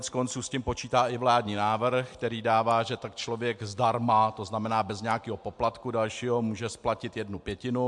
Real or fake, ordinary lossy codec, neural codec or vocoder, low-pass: real; MP3, 64 kbps; none; 10.8 kHz